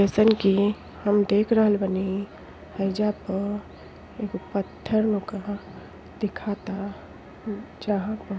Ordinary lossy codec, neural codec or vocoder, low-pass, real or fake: none; none; none; real